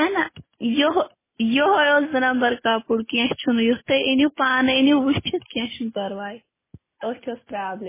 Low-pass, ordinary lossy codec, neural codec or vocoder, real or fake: 3.6 kHz; MP3, 16 kbps; none; real